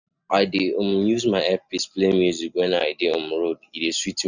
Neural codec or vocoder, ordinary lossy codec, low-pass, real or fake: none; none; 7.2 kHz; real